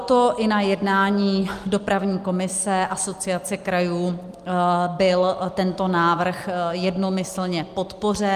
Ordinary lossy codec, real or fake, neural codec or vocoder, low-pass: Opus, 32 kbps; real; none; 14.4 kHz